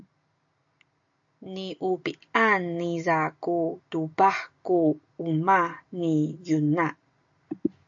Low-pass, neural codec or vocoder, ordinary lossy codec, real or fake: 7.2 kHz; none; AAC, 64 kbps; real